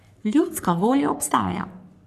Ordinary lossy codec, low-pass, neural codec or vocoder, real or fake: none; 14.4 kHz; codec, 44.1 kHz, 3.4 kbps, Pupu-Codec; fake